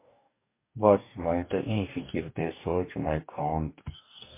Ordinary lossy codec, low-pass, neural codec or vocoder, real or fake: MP3, 24 kbps; 3.6 kHz; codec, 44.1 kHz, 2.6 kbps, DAC; fake